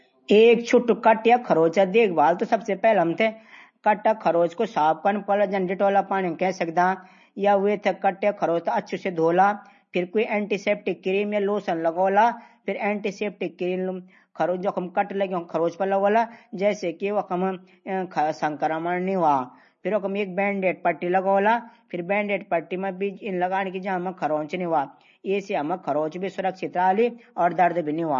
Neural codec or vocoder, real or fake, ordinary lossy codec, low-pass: none; real; MP3, 32 kbps; 7.2 kHz